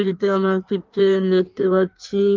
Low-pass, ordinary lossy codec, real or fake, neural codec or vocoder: 7.2 kHz; Opus, 32 kbps; fake; codec, 16 kHz in and 24 kHz out, 1.1 kbps, FireRedTTS-2 codec